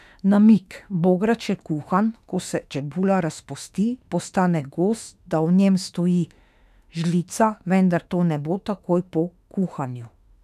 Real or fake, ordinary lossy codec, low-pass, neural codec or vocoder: fake; none; 14.4 kHz; autoencoder, 48 kHz, 32 numbers a frame, DAC-VAE, trained on Japanese speech